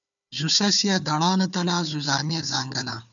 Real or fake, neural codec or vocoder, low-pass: fake; codec, 16 kHz, 4 kbps, FunCodec, trained on Chinese and English, 50 frames a second; 7.2 kHz